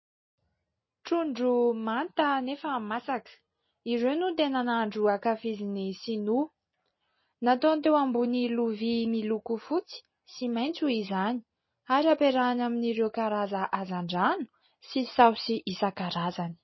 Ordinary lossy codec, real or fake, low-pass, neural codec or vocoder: MP3, 24 kbps; real; 7.2 kHz; none